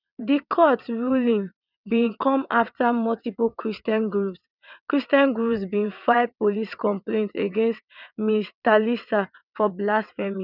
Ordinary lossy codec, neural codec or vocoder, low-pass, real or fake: none; vocoder, 22.05 kHz, 80 mel bands, WaveNeXt; 5.4 kHz; fake